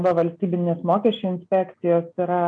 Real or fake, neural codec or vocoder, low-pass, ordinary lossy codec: real; none; 9.9 kHz; MP3, 64 kbps